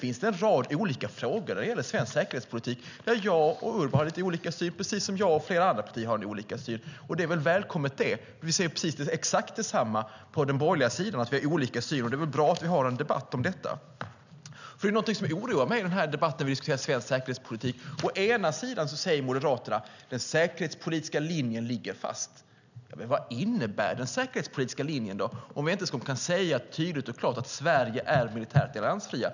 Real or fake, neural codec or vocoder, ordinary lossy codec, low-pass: real; none; none; 7.2 kHz